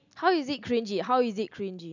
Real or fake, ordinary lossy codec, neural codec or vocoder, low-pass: real; none; none; 7.2 kHz